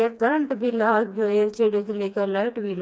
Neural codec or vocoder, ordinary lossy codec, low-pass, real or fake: codec, 16 kHz, 2 kbps, FreqCodec, smaller model; none; none; fake